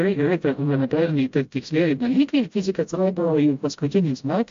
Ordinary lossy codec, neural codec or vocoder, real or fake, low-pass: MP3, 48 kbps; codec, 16 kHz, 0.5 kbps, FreqCodec, smaller model; fake; 7.2 kHz